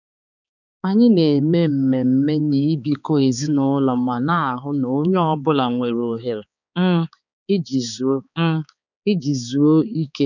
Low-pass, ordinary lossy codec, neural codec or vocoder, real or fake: 7.2 kHz; none; codec, 16 kHz, 4 kbps, X-Codec, HuBERT features, trained on balanced general audio; fake